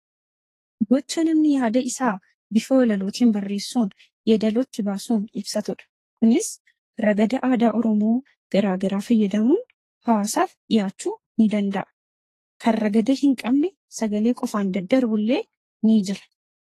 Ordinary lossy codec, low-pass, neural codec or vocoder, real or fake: AAC, 48 kbps; 14.4 kHz; codec, 44.1 kHz, 2.6 kbps, SNAC; fake